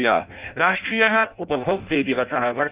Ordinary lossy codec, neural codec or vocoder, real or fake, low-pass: Opus, 24 kbps; codec, 16 kHz in and 24 kHz out, 0.6 kbps, FireRedTTS-2 codec; fake; 3.6 kHz